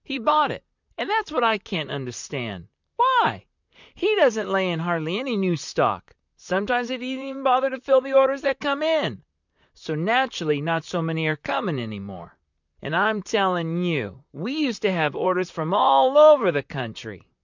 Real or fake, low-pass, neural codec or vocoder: fake; 7.2 kHz; vocoder, 44.1 kHz, 128 mel bands, Pupu-Vocoder